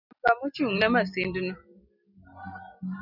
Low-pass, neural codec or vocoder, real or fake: 5.4 kHz; none; real